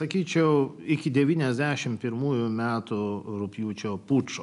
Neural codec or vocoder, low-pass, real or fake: none; 10.8 kHz; real